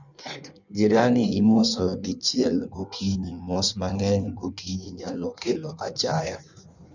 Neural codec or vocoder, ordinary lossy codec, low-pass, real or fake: codec, 16 kHz in and 24 kHz out, 1.1 kbps, FireRedTTS-2 codec; none; 7.2 kHz; fake